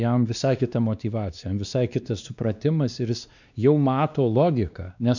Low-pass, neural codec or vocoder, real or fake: 7.2 kHz; codec, 16 kHz, 2 kbps, X-Codec, WavLM features, trained on Multilingual LibriSpeech; fake